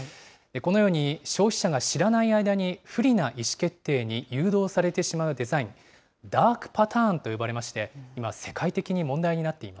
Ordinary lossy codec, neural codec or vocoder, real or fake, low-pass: none; none; real; none